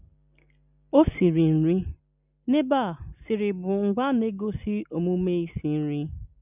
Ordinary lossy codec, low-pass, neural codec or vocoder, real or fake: none; 3.6 kHz; none; real